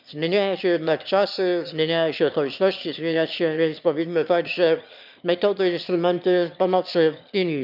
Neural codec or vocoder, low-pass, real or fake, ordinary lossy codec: autoencoder, 22.05 kHz, a latent of 192 numbers a frame, VITS, trained on one speaker; 5.4 kHz; fake; none